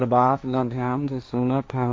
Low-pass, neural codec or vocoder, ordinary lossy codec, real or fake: none; codec, 16 kHz, 1.1 kbps, Voila-Tokenizer; none; fake